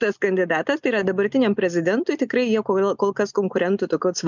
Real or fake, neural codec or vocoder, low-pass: real; none; 7.2 kHz